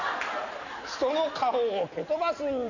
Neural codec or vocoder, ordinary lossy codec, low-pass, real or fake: none; none; 7.2 kHz; real